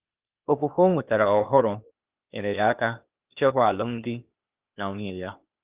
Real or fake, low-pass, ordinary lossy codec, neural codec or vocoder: fake; 3.6 kHz; Opus, 24 kbps; codec, 16 kHz, 0.8 kbps, ZipCodec